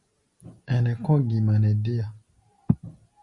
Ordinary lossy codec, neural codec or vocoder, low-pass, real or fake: Opus, 64 kbps; none; 10.8 kHz; real